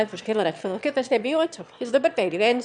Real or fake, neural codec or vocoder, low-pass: fake; autoencoder, 22.05 kHz, a latent of 192 numbers a frame, VITS, trained on one speaker; 9.9 kHz